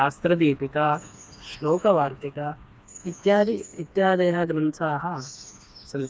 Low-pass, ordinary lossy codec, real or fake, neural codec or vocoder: none; none; fake; codec, 16 kHz, 2 kbps, FreqCodec, smaller model